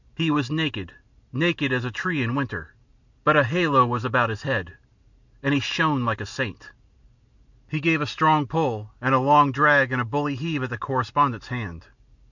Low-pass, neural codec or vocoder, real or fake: 7.2 kHz; none; real